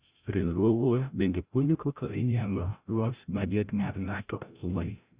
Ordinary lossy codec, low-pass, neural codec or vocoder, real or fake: Opus, 64 kbps; 3.6 kHz; codec, 16 kHz, 0.5 kbps, FreqCodec, larger model; fake